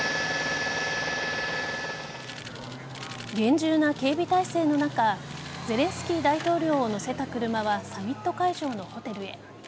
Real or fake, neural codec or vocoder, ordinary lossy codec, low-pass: real; none; none; none